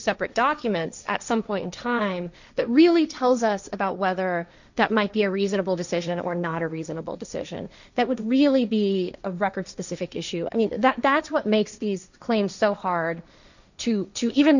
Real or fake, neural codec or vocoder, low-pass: fake; codec, 16 kHz, 1.1 kbps, Voila-Tokenizer; 7.2 kHz